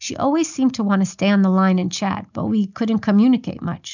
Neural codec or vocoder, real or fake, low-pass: none; real; 7.2 kHz